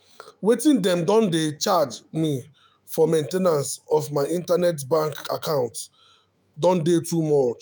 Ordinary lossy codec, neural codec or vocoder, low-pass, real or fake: none; autoencoder, 48 kHz, 128 numbers a frame, DAC-VAE, trained on Japanese speech; none; fake